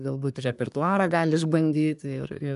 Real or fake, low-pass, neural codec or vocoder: fake; 10.8 kHz; codec, 24 kHz, 1 kbps, SNAC